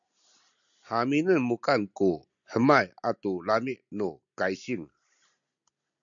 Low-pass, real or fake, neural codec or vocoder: 7.2 kHz; real; none